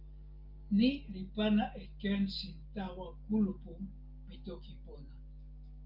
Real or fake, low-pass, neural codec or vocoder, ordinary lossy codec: real; 5.4 kHz; none; Opus, 24 kbps